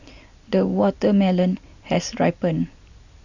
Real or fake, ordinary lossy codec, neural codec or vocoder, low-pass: real; none; none; 7.2 kHz